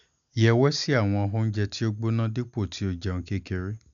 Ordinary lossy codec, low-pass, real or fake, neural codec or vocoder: none; 7.2 kHz; real; none